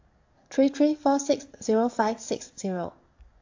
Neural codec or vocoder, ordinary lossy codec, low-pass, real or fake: codec, 44.1 kHz, 7.8 kbps, DAC; AAC, 48 kbps; 7.2 kHz; fake